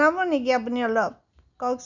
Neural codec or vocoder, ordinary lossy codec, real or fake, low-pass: none; none; real; 7.2 kHz